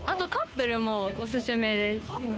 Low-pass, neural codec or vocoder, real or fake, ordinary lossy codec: none; codec, 16 kHz, 2 kbps, FunCodec, trained on Chinese and English, 25 frames a second; fake; none